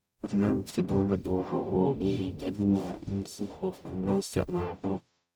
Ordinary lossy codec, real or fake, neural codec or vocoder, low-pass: none; fake; codec, 44.1 kHz, 0.9 kbps, DAC; none